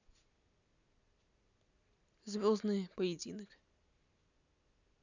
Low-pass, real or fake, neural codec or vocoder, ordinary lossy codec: 7.2 kHz; real; none; none